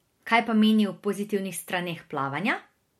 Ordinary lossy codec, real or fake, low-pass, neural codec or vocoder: MP3, 64 kbps; real; 19.8 kHz; none